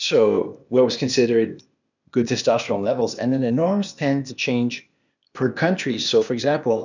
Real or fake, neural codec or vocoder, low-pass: fake; codec, 16 kHz, 0.8 kbps, ZipCodec; 7.2 kHz